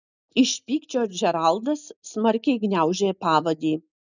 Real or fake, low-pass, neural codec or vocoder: real; 7.2 kHz; none